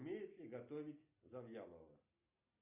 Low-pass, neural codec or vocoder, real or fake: 3.6 kHz; none; real